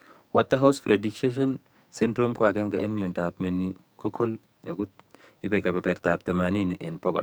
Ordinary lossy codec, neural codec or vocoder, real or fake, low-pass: none; codec, 44.1 kHz, 2.6 kbps, SNAC; fake; none